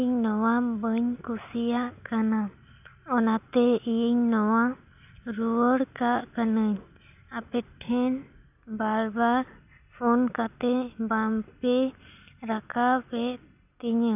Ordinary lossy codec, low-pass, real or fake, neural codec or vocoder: none; 3.6 kHz; real; none